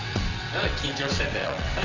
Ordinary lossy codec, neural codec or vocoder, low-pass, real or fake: none; vocoder, 22.05 kHz, 80 mel bands, WaveNeXt; 7.2 kHz; fake